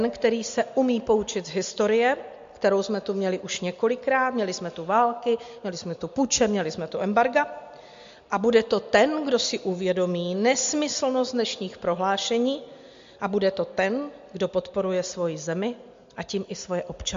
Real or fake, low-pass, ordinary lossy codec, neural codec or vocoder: real; 7.2 kHz; MP3, 48 kbps; none